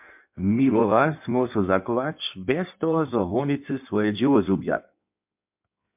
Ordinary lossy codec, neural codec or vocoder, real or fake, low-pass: MP3, 32 kbps; codec, 16 kHz in and 24 kHz out, 1.1 kbps, FireRedTTS-2 codec; fake; 3.6 kHz